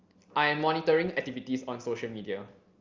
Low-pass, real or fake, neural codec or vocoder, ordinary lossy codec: 7.2 kHz; real; none; Opus, 32 kbps